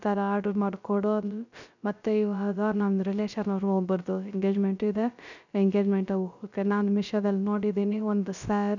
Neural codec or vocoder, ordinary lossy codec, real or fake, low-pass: codec, 16 kHz, 0.3 kbps, FocalCodec; none; fake; 7.2 kHz